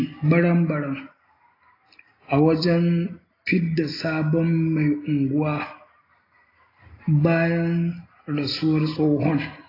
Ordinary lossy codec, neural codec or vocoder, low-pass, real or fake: AAC, 24 kbps; none; 5.4 kHz; real